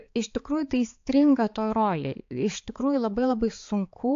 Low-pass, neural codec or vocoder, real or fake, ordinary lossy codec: 7.2 kHz; codec, 16 kHz, 4 kbps, X-Codec, HuBERT features, trained on balanced general audio; fake; AAC, 48 kbps